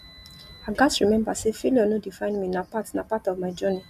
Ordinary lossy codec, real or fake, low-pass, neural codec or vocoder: MP3, 96 kbps; real; 14.4 kHz; none